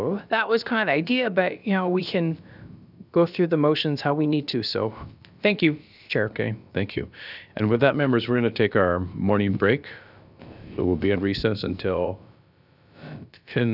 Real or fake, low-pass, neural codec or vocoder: fake; 5.4 kHz; codec, 16 kHz, about 1 kbps, DyCAST, with the encoder's durations